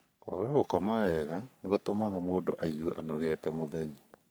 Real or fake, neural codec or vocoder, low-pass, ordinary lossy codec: fake; codec, 44.1 kHz, 3.4 kbps, Pupu-Codec; none; none